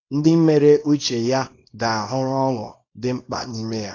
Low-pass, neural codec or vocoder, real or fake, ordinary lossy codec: 7.2 kHz; codec, 24 kHz, 0.9 kbps, WavTokenizer, small release; fake; AAC, 32 kbps